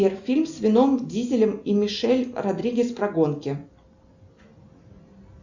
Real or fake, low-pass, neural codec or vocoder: real; 7.2 kHz; none